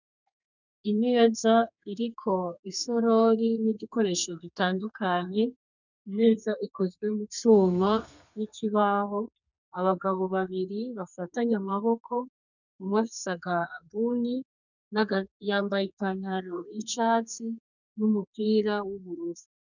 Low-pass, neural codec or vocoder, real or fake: 7.2 kHz; codec, 32 kHz, 1.9 kbps, SNAC; fake